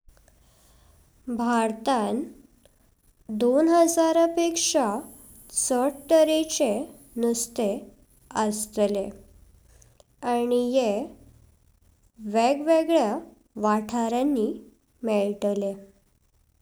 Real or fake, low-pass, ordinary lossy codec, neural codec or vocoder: real; none; none; none